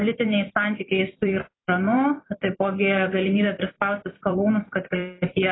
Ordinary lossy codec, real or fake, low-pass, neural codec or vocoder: AAC, 16 kbps; fake; 7.2 kHz; vocoder, 44.1 kHz, 128 mel bands every 256 samples, BigVGAN v2